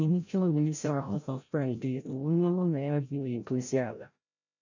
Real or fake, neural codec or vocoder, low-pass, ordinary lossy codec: fake; codec, 16 kHz, 0.5 kbps, FreqCodec, larger model; 7.2 kHz; none